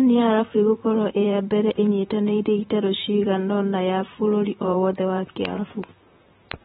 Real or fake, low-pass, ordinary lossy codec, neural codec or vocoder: fake; 19.8 kHz; AAC, 16 kbps; vocoder, 44.1 kHz, 128 mel bands, Pupu-Vocoder